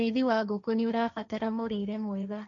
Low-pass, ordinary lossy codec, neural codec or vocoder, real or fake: 7.2 kHz; none; codec, 16 kHz, 1.1 kbps, Voila-Tokenizer; fake